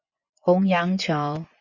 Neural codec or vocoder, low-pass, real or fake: vocoder, 24 kHz, 100 mel bands, Vocos; 7.2 kHz; fake